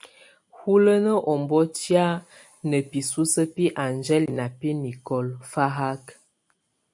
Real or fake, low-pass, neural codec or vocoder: real; 10.8 kHz; none